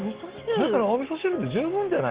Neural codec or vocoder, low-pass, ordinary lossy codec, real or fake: none; 3.6 kHz; Opus, 16 kbps; real